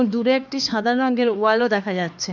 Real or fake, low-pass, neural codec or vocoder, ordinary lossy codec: fake; 7.2 kHz; codec, 16 kHz, 2 kbps, X-Codec, HuBERT features, trained on LibriSpeech; none